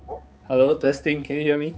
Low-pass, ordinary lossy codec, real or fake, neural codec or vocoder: none; none; fake; codec, 16 kHz, 4 kbps, X-Codec, HuBERT features, trained on balanced general audio